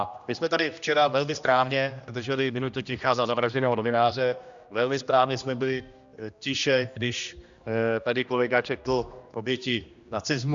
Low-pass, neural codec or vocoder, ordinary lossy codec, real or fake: 7.2 kHz; codec, 16 kHz, 1 kbps, X-Codec, HuBERT features, trained on general audio; Opus, 64 kbps; fake